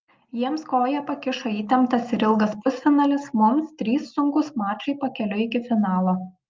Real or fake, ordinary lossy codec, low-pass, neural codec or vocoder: real; Opus, 32 kbps; 7.2 kHz; none